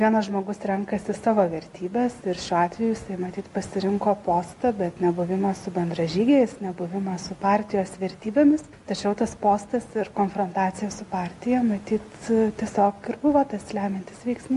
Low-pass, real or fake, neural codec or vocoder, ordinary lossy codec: 14.4 kHz; real; none; MP3, 48 kbps